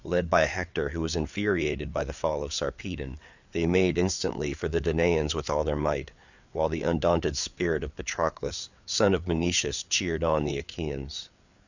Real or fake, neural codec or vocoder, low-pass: fake; codec, 16 kHz, 4 kbps, FreqCodec, larger model; 7.2 kHz